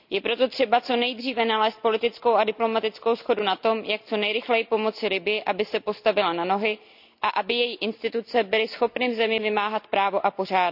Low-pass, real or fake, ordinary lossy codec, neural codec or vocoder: 5.4 kHz; real; none; none